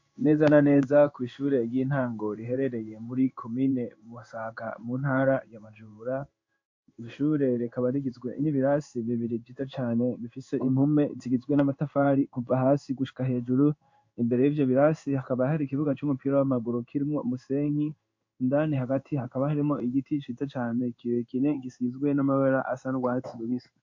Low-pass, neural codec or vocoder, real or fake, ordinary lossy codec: 7.2 kHz; codec, 16 kHz in and 24 kHz out, 1 kbps, XY-Tokenizer; fake; MP3, 48 kbps